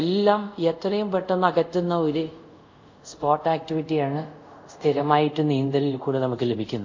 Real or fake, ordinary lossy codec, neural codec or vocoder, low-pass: fake; MP3, 48 kbps; codec, 24 kHz, 0.5 kbps, DualCodec; 7.2 kHz